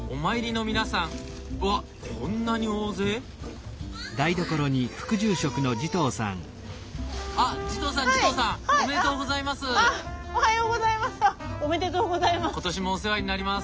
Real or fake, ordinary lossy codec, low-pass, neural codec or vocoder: real; none; none; none